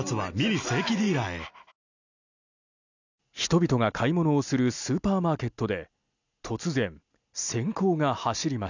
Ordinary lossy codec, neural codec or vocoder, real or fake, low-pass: none; none; real; 7.2 kHz